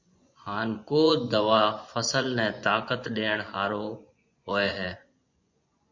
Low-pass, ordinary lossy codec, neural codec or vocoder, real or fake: 7.2 kHz; MP3, 48 kbps; vocoder, 24 kHz, 100 mel bands, Vocos; fake